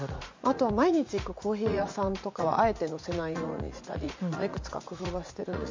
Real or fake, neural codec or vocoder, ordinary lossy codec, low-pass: fake; vocoder, 44.1 kHz, 80 mel bands, Vocos; MP3, 48 kbps; 7.2 kHz